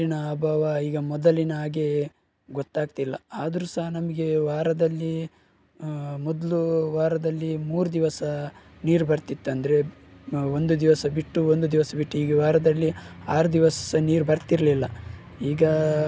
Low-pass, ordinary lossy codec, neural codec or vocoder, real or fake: none; none; none; real